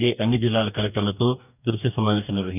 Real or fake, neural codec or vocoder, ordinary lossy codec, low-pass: fake; codec, 44.1 kHz, 2.6 kbps, DAC; none; 3.6 kHz